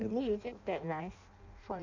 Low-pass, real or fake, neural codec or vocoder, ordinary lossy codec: 7.2 kHz; fake; codec, 16 kHz in and 24 kHz out, 0.6 kbps, FireRedTTS-2 codec; MP3, 64 kbps